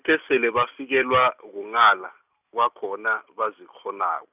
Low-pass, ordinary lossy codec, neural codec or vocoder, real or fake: 3.6 kHz; none; none; real